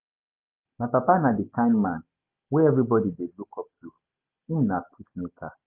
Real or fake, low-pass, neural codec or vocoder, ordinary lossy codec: real; 3.6 kHz; none; Opus, 24 kbps